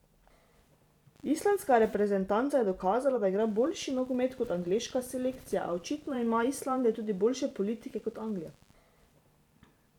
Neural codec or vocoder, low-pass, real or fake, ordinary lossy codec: vocoder, 44.1 kHz, 128 mel bands every 512 samples, BigVGAN v2; 19.8 kHz; fake; none